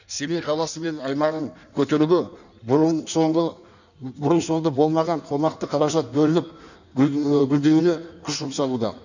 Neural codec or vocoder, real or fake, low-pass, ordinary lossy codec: codec, 16 kHz in and 24 kHz out, 1.1 kbps, FireRedTTS-2 codec; fake; 7.2 kHz; none